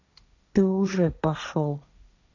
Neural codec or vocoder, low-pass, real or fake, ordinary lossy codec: codec, 16 kHz, 1.1 kbps, Voila-Tokenizer; 7.2 kHz; fake; none